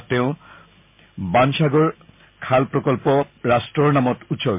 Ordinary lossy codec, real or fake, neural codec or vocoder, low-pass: MP3, 32 kbps; real; none; 3.6 kHz